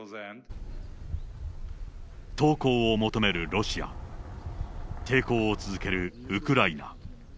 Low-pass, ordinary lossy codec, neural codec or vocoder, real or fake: none; none; none; real